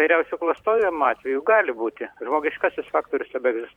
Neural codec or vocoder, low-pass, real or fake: vocoder, 44.1 kHz, 128 mel bands every 256 samples, BigVGAN v2; 19.8 kHz; fake